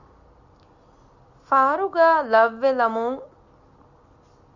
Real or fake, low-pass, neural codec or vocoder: real; 7.2 kHz; none